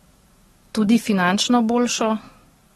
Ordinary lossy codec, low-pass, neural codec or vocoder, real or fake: AAC, 32 kbps; 19.8 kHz; codec, 44.1 kHz, 7.8 kbps, DAC; fake